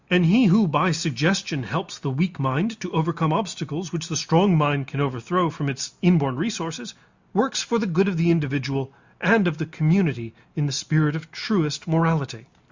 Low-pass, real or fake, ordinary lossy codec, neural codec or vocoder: 7.2 kHz; real; Opus, 64 kbps; none